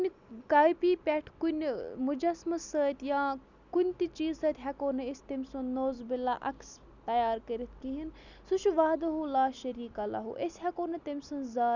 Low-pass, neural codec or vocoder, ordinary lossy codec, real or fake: 7.2 kHz; none; none; real